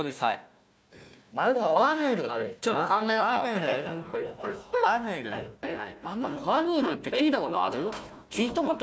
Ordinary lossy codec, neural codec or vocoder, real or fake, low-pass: none; codec, 16 kHz, 1 kbps, FunCodec, trained on Chinese and English, 50 frames a second; fake; none